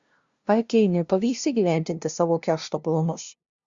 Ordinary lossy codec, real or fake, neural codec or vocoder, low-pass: Opus, 64 kbps; fake; codec, 16 kHz, 0.5 kbps, FunCodec, trained on LibriTTS, 25 frames a second; 7.2 kHz